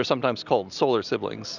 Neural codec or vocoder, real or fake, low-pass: none; real; 7.2 kHz